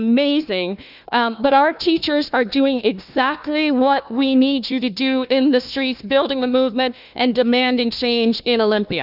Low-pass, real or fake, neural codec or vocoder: 5.4 kHz; fake; codec, 16 kHz, 1 kbps, FunCodec, trained on Chinese and English, 50 frames a second